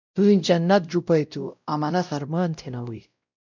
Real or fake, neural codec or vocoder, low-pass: fake; codec, 16 kHz, 0.5 kbps, X-Codec, WavLM features, trained on Multilingual LibriSpeech; 7.2 kHz